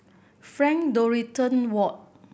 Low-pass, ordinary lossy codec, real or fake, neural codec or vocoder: none; none; real; none